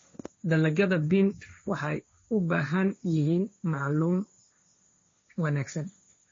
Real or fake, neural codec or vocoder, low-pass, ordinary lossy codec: fake; codec, 16 kHz, 1.1 kbps, Voila-Tokenizer; 7.2 kHz; MP3, 32 kbps